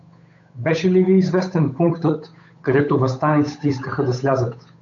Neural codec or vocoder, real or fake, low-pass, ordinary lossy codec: codec, 16 kHz, 8 kbps, FunCodec, trained on Chinese and English, 25 frames a second; fake; 7.2 kHz; AAC, 64 kbps